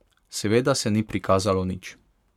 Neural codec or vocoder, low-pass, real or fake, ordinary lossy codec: codec, 44.1 kHz, 7.8 kbps, Pupu-Codec; 19.8 kHz; fake; MP3, 96 kbps